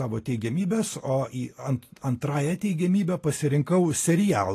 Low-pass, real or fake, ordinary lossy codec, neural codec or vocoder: 14.4 kHz; real; AAC, 48 kbps; none